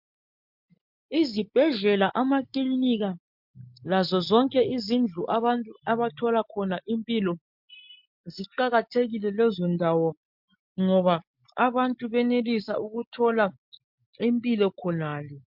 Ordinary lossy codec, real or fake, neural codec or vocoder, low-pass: MP3, 48 kbps; real; none; 5.4 kHz